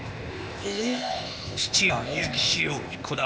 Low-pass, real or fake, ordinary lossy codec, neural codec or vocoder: none; fake; none; codec, 16 kHz, 0.8 kbps, ZipCodec